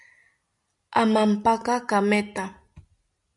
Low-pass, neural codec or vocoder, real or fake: 10.8 kHz; none; real